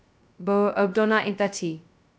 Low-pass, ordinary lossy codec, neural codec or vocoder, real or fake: none; none; codec, 16 kHz, 0.2 kbps, FocalCodec; fake